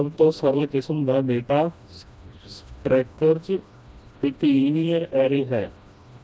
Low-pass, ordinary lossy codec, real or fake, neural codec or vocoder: none; none; fake; codec, 16 kHz, 1 kbps, FreqCodec, smaller model